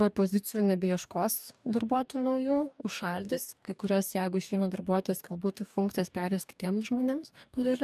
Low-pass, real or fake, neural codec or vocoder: 14.4 kHz; fake; codec, 44.1 kHz, 2.6 kbps, DAC